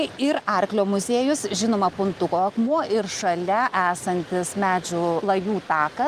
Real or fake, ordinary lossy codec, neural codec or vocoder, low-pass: real; Opus, 32 kbps; none; 14.4 kHz